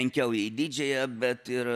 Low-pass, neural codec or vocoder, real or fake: 14.4 kHz; none; real